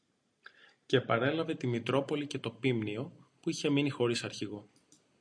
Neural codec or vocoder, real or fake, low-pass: none; real; 9.9 kHz